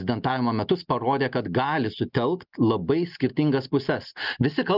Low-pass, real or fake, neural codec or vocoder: 5.4 kHz; real; none